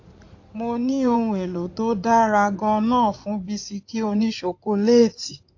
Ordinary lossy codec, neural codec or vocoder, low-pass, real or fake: AAC, 48 kbps; codec, 16 kHz in and 24 kHz out, 2.2 kbps, FireRedTTS-2 codec; 7.2 kHz; fake